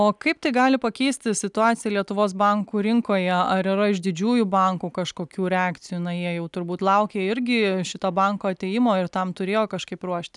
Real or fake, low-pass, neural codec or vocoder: real; 10.8 kHz; none